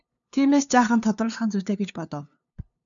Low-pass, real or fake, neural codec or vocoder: 7.2 kHz; fake; codec, 16 kHz, 2 kbps, FunCodec, trained on LibriTTS, 25 frames a second